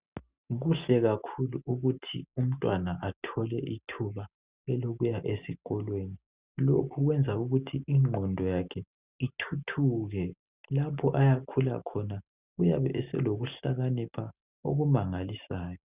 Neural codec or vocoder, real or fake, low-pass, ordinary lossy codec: none; real; 3.6 kHz; Opus, 64 kbps